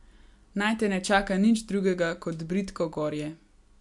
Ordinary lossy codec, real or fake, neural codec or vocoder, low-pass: MP3, 64 kbps; real; none; 10.8 kHz